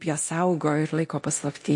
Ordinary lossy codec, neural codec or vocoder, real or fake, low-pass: MP3, 48 kbps; codec, 24 kHz, 0.9 kbps, DualCodec; fake; 10.8 kHz